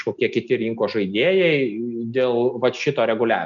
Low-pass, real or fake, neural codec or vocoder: 7.2 kHz; real; none